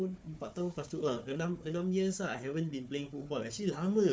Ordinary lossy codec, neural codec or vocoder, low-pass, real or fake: none; codec, 16 kHz, 4 kbps, FunCodec, trained on Chinese and English, 50 frames a second; none; fake